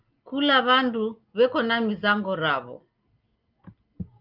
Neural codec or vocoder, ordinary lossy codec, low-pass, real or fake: none; Opus, 24 kbps; 5.4 kHz; real